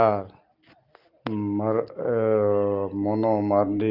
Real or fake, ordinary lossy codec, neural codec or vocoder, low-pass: real; Opus, 24 kbps; none; 5.4 kHz